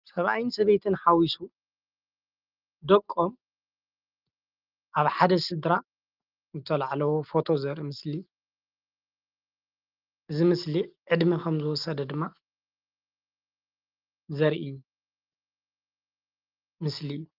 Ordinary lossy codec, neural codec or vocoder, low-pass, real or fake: Opus, 32 kbps; none; 5.4 kHz; real